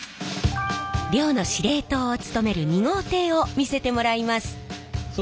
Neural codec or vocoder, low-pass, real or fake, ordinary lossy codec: none; none; real; none